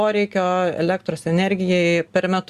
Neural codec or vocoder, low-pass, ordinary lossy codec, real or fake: none; 14.4 kHz; Opus, 64 kbps; real